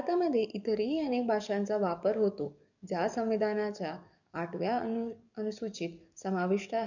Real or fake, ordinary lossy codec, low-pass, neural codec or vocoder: fake; none; 7.2 kHz; codec, 44.1 kHz, 7.8 kbps, DAC